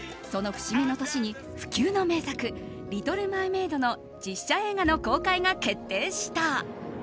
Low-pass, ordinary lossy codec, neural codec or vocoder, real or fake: none; none; none; real